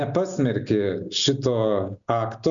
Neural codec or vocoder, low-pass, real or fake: none; 7.2 kHz; real